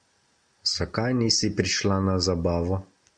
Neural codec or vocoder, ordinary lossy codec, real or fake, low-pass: none; Opus, 64 kbps; real; 9.9 kHz